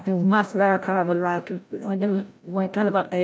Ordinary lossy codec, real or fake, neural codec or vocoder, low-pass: none; fake; codec, 16 kHz, 0.5 kbps, FreqCodec, larger model; none